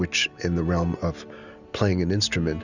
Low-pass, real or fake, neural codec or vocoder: 7.2 kHz; real; none